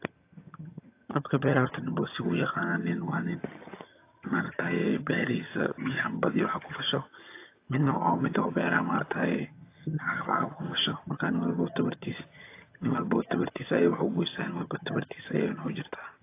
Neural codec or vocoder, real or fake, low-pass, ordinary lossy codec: vocoder, 22.05 kHz, 80 mel bands, HiFi-GAN; fake; 3.6 kHz; AAC, 24 kbps